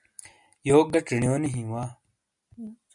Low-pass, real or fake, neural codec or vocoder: 10.8 kHz; real; none